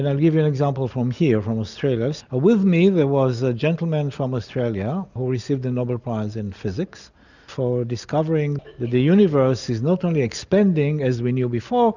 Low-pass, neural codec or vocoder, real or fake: 7.2 kHz; none; real